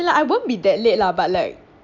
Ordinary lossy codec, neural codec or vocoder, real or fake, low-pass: none; none; real; 7.2 kHz